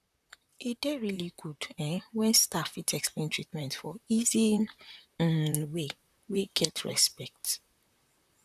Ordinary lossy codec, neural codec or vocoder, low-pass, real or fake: none; vocoder, 44.1 kHz, 128 mel bands, Pupu-Vocoder; 14.4 kHz; fake